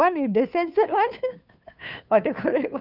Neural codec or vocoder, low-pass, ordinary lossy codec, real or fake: codec, 16 kHz, 2 kbps, FunCodec, trained on Chinese and English, 25 frames a second; 5.4 kHz; none; fake